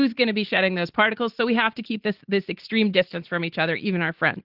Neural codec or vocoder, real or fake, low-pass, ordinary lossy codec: none; real; 5.4 kHz; Opus, 16 kbps